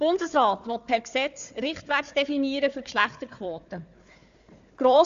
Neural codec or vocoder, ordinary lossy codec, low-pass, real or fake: codec, 16 kHz, 4 kbps, FunCodec, trained on Chinese and English, 50 frames a second; AAC, 96 kbps; 7.2 kHz; fake